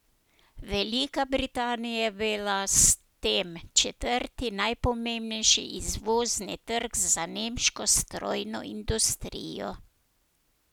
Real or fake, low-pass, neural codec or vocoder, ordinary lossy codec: real; none; none; none